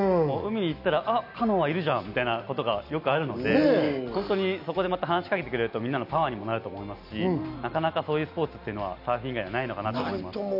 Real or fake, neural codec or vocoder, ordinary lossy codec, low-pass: real; none; none; 5.4 kHz